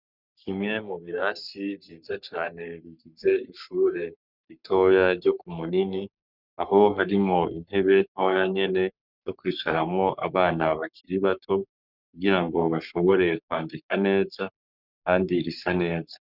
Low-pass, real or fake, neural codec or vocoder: 5.4 kHz; fake; codec, 44.1 kHz, 3.4 kbps, Pupu-Codec